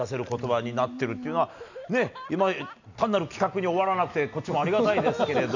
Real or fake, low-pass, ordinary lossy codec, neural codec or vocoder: real; 7.2 kHz; none; none